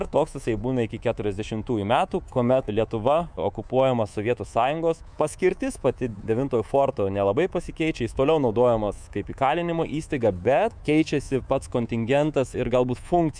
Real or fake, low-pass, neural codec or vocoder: fake; 9.9 kHz; codec, 24 kHz, 3.1 kbps, DualCodec